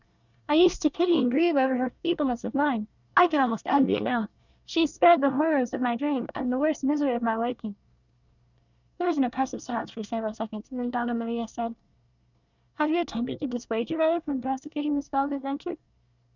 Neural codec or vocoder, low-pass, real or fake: codec, 24 kHz, 1 kbps, SNAC; 7.2 kHz; fake